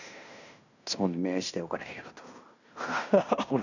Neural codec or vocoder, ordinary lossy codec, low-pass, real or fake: codec, 16 kHz in and 24 kHz out, 0.9 kbps, LongCat-Audio-Codec, fine tuned four codebook decoder; none; 7.2 kHz; fake